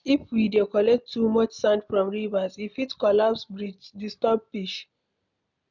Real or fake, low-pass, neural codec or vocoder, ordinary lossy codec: real; 7.2 kHz; none; none